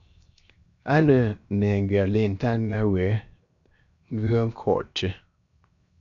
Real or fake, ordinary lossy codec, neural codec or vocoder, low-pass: fake; AAC, 64 kbps; codec, 16 kHz, 0.7 kbps, FocalCodec; 7.2 kHz